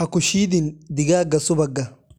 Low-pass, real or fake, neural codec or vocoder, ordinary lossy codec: 19.8 kHz; real; none; none